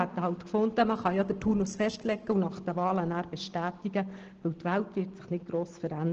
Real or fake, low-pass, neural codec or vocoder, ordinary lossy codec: real; 7.2 kHz; none; Opus, 16 kbps